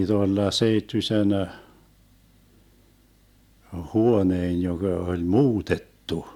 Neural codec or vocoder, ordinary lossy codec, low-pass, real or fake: none; MP3, 96 kbps; 19.8 kHz; real